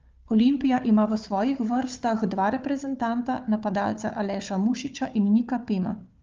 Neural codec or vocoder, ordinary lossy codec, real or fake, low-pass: codec, 16 kHz, 4 kbps, FunCodec, trained on Chinese and English, 50 frames a second; Opus, 24 kbps; fake; 7.2 kHz